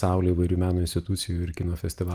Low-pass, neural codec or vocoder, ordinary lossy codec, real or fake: 14.4 kHz; none; Opus, 32 kbps; real